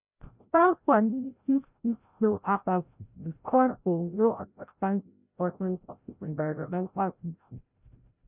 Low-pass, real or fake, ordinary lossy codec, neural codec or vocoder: 3.6 kHz; fake; none; codec, 16 kHz, 0.5 kbps, FreqCodec, larger model